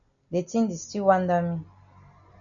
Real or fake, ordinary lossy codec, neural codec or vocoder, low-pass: real; MP3, 96 kbps; none; 7.2 kHz